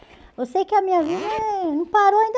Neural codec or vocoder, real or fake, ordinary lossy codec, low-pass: none; real; none; none